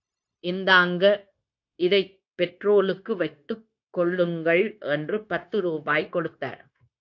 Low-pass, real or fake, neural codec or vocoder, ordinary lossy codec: 7.2 kHz; fake; codec, 16 kHz, 0.9 kbps, LongCat-Audio-Codec; AAC, 48 kbps